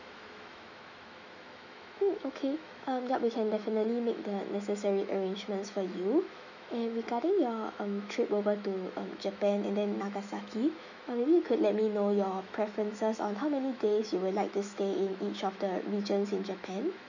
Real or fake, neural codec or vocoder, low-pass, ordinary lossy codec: fake; autoencoder, 48 kHz, 128 numbers a frame, DAC-VAE, trained on Japanese speech; 7.2 kHz; none